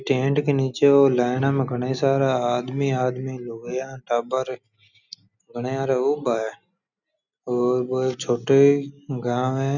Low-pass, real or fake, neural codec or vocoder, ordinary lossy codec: 7.2 kHz; real; none; none